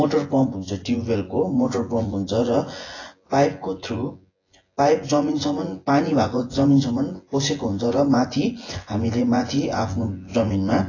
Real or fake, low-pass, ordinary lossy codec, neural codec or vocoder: fake; 7.2 kHz; AAC, 32 kbps; vocoder, 24 kHz, 100 mel bands, Vocos